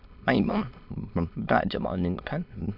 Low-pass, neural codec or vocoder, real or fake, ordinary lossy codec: 5.4 kHz; autoencoder, 22.05 kHz, a latent of 192 numbers a frame, VITS, trained on many speakers; fake; MP3, 48 kbps